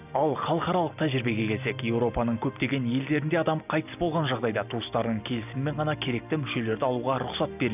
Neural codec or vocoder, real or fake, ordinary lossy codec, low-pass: none; real; none; 3.6 kHz